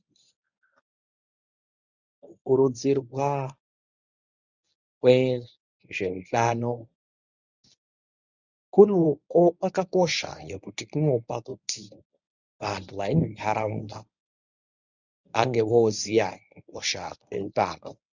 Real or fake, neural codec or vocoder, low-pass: fake; codec, 24 kHz, 0.9 kbps, WavTokenizer, medium speech release version 1; 7.2 kHz